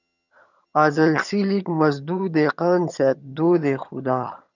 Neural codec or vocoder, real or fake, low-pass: vocoder, 22.05 kHz, 80 mel bands, HiFi-GAN; fake; 7.2 kHz